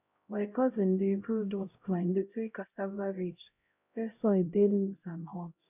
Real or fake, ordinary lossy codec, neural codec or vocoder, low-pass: fake; none; codec, 16 kHz, 0.5 kbps, X-Codec, HuBERT features, trained on LibriSpeech; 3.6 kHz